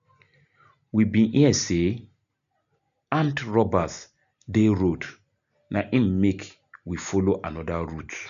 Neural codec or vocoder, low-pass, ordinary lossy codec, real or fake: none; 7.2 kHz; none; real